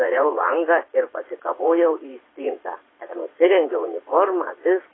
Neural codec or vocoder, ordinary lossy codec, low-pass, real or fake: vocoder, 22.05 kHz, 80 mel bands, Vocos; AAC, 16 kbps; 7.2 kHz; fake